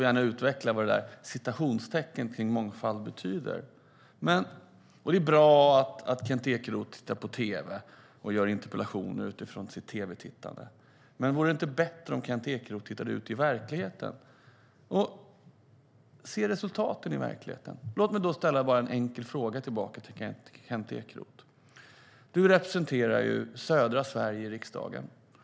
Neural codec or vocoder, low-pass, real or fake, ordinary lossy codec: none; none; real; none